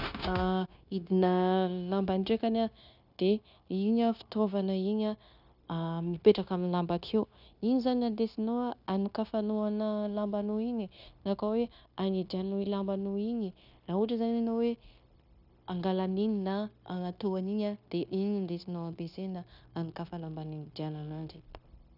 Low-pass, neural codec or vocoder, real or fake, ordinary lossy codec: 5.4 kHz; codec, 16 kHz, 0.9 kbps, LongCat-Audio-Codec; fake; none